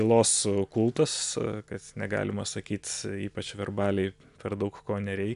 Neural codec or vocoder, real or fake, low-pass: none; real; 10.8 kHz